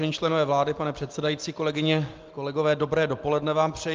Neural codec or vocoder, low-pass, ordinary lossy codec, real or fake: none; 7.2 kHz; Opus, 32 kbps; real